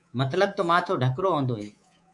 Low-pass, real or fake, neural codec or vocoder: 10.8 kHz; fake; codec, 24 kHz, 3.1 kbps, DualCodec